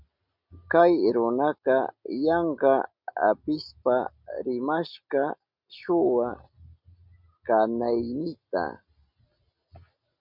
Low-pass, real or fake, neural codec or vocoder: 5.4 kHz; real; none